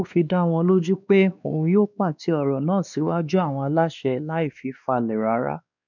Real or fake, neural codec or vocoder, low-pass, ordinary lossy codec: fake; codec, 16 kHz, 2 kbps, X-Codec, WavLM features, trained on Multilingual LibriSpeech; 7.2 kHz; none